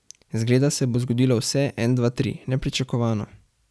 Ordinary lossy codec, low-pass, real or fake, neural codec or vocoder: none; none; real; none